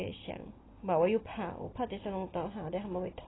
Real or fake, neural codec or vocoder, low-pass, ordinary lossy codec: real; none; 7.2 kHz; AAC, 16 kbps